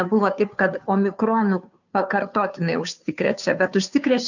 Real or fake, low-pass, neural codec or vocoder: fake; 7.2 kHz; codec, 16 kHz, 2 kbps, FunCodec, trained on Chinese and English, 25 frames a second